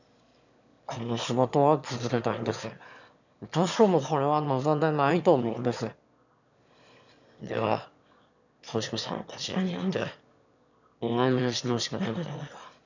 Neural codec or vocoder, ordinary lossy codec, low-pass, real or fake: autoencoder, 22.05 kHz, a latent of 192 numbers a frame, VITS, trained on one speaker; none; 7.2 kHz; fake